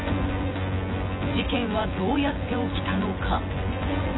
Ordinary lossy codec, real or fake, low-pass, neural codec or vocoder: AAC, 16 kbps; fake; 7.2 kHz; vocoder, 24 kHz, 100 mel bands, Vocos